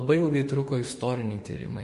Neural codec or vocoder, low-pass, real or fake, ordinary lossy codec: autoencoder, 48 kHz, 32 numbers a frame, DAC-VAE, trained on Japanese speech; 14.4 kHz; fake; MP3, 48 kbps